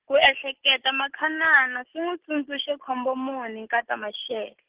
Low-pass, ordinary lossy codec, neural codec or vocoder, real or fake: 3.6 kHz; Opus, 16 kbps; none; real